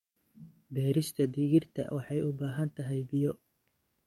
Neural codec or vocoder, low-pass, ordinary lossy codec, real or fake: codec, 44.1 kHz, 7.8 kbps, DAC; 19.8 kHz; MP3, 64 kbps; fake